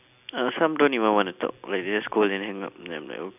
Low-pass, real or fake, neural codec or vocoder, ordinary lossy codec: 3.6 kHz; real; none; none